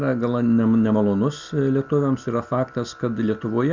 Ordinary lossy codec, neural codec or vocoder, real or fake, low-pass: Opus, 64 kbps; none; real; 7.2 kHz